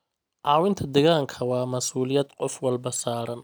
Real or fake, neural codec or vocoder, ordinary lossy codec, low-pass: real; none; none; none